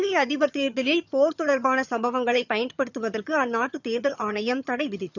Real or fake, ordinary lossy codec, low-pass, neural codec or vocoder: fake; none; 7.2 kHz; vocoder, 22.05 kHz, 80 mel bands, HiFi-GAN